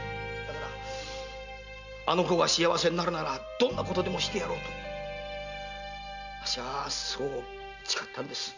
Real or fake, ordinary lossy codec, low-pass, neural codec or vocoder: real; none; 7.2 kHz; none